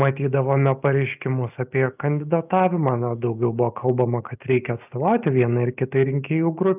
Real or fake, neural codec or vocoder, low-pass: real; none; 3.6 kHz